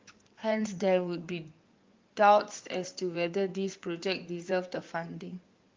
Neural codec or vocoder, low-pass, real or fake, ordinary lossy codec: codec, 16 kHz in and 24 kHz out, 2.2 kbps, FireRedTTS-2 codec; 7.2 kHz; fake; Opus, 24 kbps